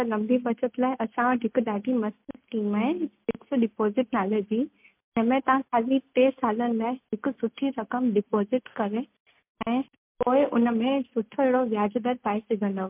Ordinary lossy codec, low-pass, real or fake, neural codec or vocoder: MP3, 32 kbps; 3.6 kHz; real; none